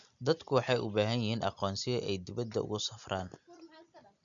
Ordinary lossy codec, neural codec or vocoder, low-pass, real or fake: none; none; 7.2 kHz; real